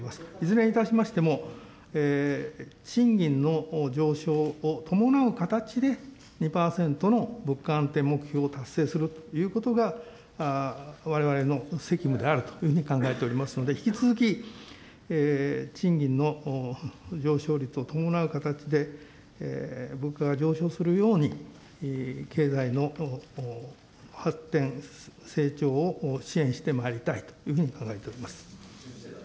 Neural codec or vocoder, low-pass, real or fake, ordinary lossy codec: none; none; real; none